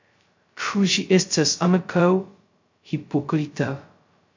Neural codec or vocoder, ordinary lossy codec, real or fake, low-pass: codec, 16 kHz, 0.2 kbps, FocalCodec; MP3, 48 kbps; fake; 7.2 kHz